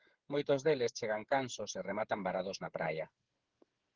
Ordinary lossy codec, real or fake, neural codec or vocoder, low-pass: Opus, 16 kbps; fake; codec, 16 kHz, 8 kbps, FreqCodec, smaller model; 7.2 kHz